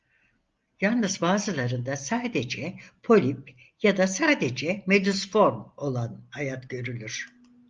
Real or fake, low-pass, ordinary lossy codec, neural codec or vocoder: real; 7.2 kHz; Opus, 32 kbps; none